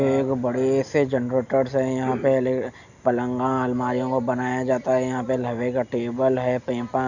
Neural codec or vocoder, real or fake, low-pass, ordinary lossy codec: none; real; 7.2 kHz; none